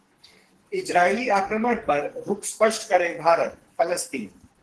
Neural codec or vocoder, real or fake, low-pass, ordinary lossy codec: codec, 44.1 kHz, 2.6 kbps, SNAC; fake; 10.8 kHz; Opus, 16 kbps